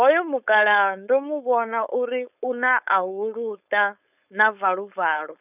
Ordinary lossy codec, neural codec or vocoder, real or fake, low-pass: none; codec, 16 kHz, 4.8 kbps, FACodec; fake; 3.6 kHz